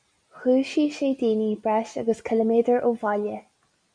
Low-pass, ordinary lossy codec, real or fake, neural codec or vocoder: 9.9 kHz; MP3, 96 kbps; real; none